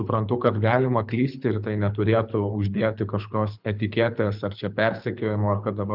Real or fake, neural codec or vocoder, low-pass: fake; codec, 24 kHz, 6 kbps, HILCodec; 5.4 kHz